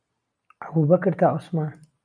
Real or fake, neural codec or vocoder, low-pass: real; none; 9.9 kHz